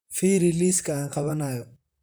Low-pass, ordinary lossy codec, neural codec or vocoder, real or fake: none; none; vocoder, 44.1 kHz, 128 mel bands, Pupu-Vocoder; fake